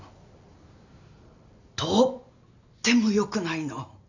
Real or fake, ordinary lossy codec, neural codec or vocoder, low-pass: real; none; none; 7.2 kHz